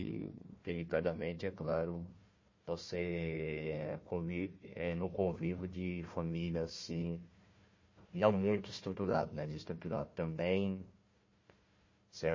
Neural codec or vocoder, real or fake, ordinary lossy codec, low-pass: codec, 16 kHz, 1 kbps, FunCodec, trained on Chinese and English, 50 frames a second; fake; MP3, 32 kbps; 7.2 kHz